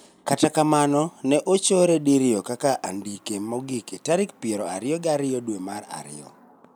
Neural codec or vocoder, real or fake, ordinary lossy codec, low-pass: none; real; none; none